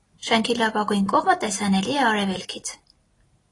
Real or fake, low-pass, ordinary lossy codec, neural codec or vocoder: real; 10.8 kHz; AAC, 32 kbps; none